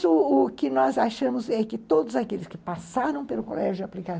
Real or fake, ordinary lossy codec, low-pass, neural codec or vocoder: real; none; none; none